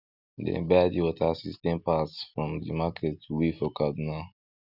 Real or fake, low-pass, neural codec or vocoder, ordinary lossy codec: real; 5.4 kHz; none; none